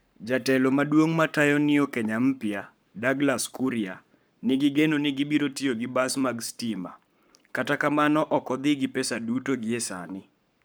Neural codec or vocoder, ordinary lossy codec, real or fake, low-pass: codec, 44.1 kHz, 7.8 kbps, Pupu-Codec; none; fake; none